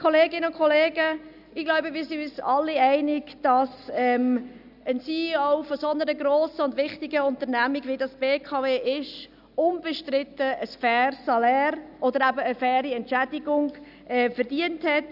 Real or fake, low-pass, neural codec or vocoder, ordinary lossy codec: real; 5.4 kHz; none; none